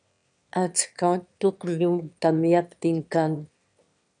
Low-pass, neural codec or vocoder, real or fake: 9.9 kHz; autoencoder, 22.05 kHz, a latent of 192 numbers a frame, VITS, trained on one speaker; fake